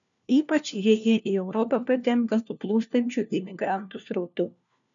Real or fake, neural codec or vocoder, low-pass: fake; codec, 16 kHz, 1 kbps, FunCodec, trained on LibriTTS, 50 frames a second; 7.2 kHz